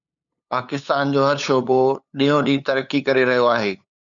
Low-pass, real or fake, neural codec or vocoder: 7.2 kHz; fake; codec, 16 kHz, 8 kbps, FunCodec, trained on LibriTTS, 25 frames a second